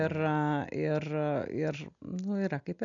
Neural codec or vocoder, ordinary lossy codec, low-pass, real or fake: none; MP3, 96 kbps; 7.2 kHz; real